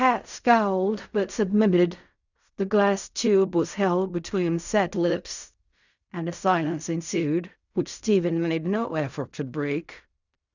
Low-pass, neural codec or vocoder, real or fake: 7.2 kHz; codec, 16 kHz in and 24 kHz out, 0.4 kbps, LongCat-Audio-Codec, fine tuned four codebook decoder; fake